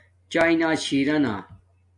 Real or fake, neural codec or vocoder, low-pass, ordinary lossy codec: real; none; 10.8 kHz; AAC, 64 kbps